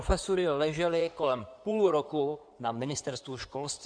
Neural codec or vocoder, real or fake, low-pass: codec, 16 kHz in and 24 kHz out, 2.2 kbps, FireRedTTS-2 codec; fake; 9.9 kHz